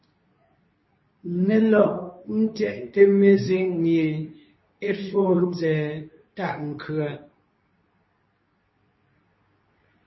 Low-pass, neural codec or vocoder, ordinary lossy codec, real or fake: 7.2 kHz; codec, 24 kHz, 0.9 kbps, WavTokenizer, medium speech release version 2; MP3, 24 kbps; fake